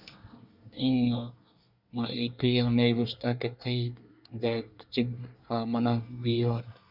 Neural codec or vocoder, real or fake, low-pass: codec, 24 kHz, 1 kbps, SNAC; fake; 5.4 kHz